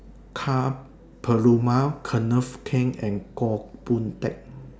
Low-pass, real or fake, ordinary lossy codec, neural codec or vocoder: none; real; none; none